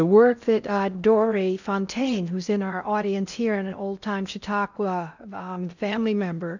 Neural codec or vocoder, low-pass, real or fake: codec, 16 kHz in and 24 kHz out, 0.6 kbps, FocalCodec, streaming, 4096 codes; 7.2 kHz; fake